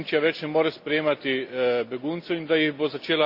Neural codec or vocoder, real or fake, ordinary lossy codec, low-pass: none; real; Opus, 64 kbps; 5.4 kHz